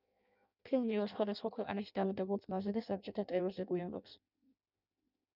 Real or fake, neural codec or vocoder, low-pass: fake; codec, 16 kHz in and 24 kHz out, 0.6 kbps, FireRedTTS-2 codec; 5.4 kHz